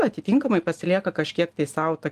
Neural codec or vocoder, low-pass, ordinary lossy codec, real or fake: none; 14.4 kHz; Opus, 24 kbps; real